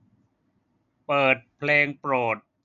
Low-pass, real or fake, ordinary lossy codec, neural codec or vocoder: 7.2 kHz; real; none; none